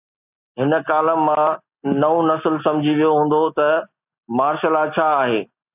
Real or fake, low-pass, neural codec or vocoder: real; 3.6 kHz; none